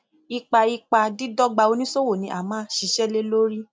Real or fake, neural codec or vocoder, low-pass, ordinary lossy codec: real; none; none; none